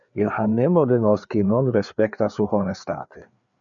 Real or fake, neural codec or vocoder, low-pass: fake; codec, 16 kHz, 4 kbps, FreqCodec, larger model; 7.2 kHz